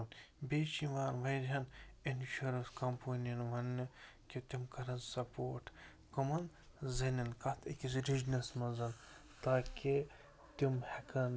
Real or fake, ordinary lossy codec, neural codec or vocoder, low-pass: real; none; none; none